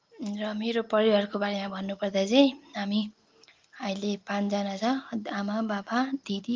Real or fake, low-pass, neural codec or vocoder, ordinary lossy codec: real; 7.2 kHz; none; Opus, 24 kbps